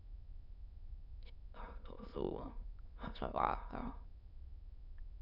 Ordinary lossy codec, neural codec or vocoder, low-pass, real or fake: none; autoencoder, 22.05 kHz, a latent of 192 numbers a frame, VITS, trained on many speakers; 5.4 kHz; fake